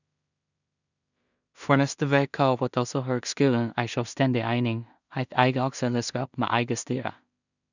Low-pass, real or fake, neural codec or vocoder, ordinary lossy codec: 7.2 kHz; fake; codec, 16 kHz in and 24 kHz out, 0.4 kbps, LongCat-Audio-Codec, two codebook decoder; none